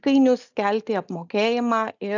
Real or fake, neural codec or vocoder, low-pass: real; none; 7.2 kHz